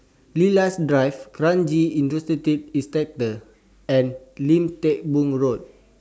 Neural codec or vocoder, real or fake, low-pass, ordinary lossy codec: none; real; none; none